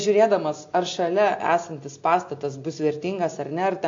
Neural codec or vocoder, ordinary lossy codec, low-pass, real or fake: none; AAC, 48 kbps; 7.2 kHz; real